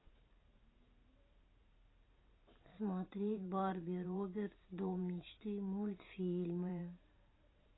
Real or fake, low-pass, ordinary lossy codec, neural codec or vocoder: fake; 7.2 kHz; AAC, 16 kbps; vocoder, 44.1 kHz, 128 mel bands every 512 samples, BigVGAN v2